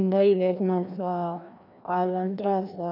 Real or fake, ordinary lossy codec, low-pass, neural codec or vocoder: fake; none; 5.4 kHz; codec, 16 kHz, 1 kbps, FreqCodec, larger model